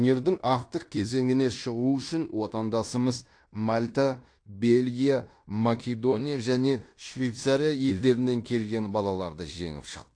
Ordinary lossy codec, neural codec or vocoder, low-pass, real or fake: AAC, 48 kbps; codec, 16 kHz in and 24 kHz out, 0.9 kbps, LongCat-Audio-Codec, fine tuned four codebook decoder; 9.9 kHz; fake